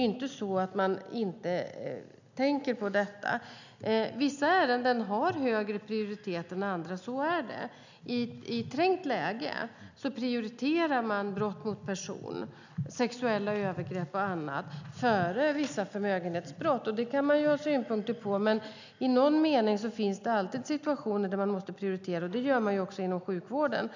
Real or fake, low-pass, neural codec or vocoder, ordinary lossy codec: real; 7.2 kHz; none; none